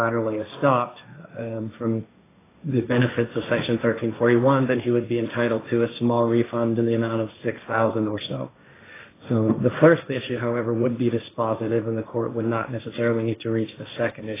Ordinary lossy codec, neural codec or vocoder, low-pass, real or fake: AAC, 16 kbps; codec, 16 kHz, 1.1 kbps, Voila-Tokenizer; 3.6 kHz; fake